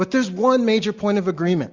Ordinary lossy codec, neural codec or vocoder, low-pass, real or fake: Opus, 64 kbps; none; 7.2 kHz; real